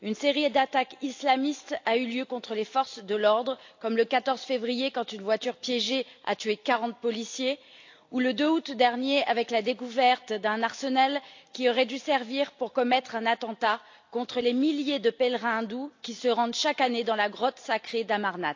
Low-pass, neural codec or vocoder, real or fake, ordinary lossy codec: 7.2 kHz; none; real; AAC, 48 kbps